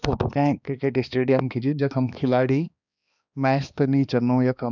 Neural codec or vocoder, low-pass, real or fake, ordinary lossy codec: codec, 16 kHz, 2 kbps, X-Codec, HuBERT features, trained on balanced general audio; 7.2 kHz; fake; none